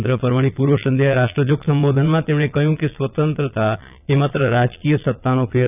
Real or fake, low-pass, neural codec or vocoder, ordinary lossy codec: fake; 3.6 kHz; vocoder, 22.05 kHz, 80 mel bands, Vocos; none